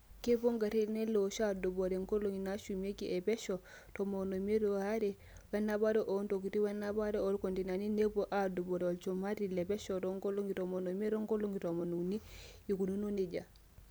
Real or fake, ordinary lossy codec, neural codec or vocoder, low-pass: real; none; none; none